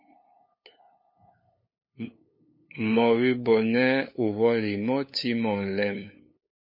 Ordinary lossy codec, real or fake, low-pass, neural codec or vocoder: MP3, 24 kbps; fake; 5.4 kHz; codec, 16 kHz, 8 kbps, FunCodec, trained on LibriTTS, 25 frames a second